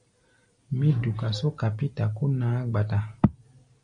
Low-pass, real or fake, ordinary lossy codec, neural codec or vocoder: 9.9 kHz; real; MP3, 96 kbps; none